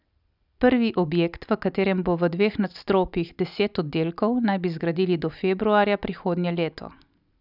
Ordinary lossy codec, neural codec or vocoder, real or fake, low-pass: none; none; real; 5.4 kHz